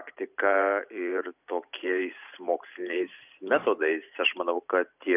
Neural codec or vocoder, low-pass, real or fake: vocoder, 44.1 kHz, 128 mel bands every 512 samples, BigVGAN v2; 3.6 kHz; fake